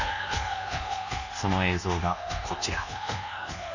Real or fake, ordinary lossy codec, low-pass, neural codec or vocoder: fake; none; 7.2 kHz; codec, 24 kHz, 1.2 kbps, DualCodec